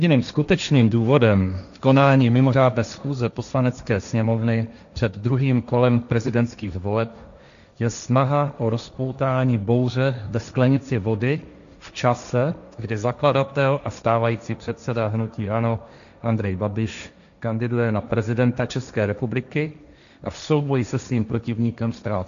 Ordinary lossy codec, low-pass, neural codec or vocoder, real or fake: MP3, 96 kbps; 7.2 kHz; codec, 16 kHz, 1.1 kbps, Voila-Tokenizer; fake